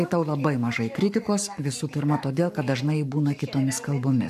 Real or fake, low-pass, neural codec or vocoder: fake; 14.4 kHz; codec, 44.1 kHz, 7.8 kbps, Pupu-Codec